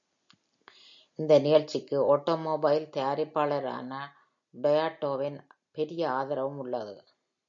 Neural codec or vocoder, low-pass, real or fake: none; 7.2 kHz; real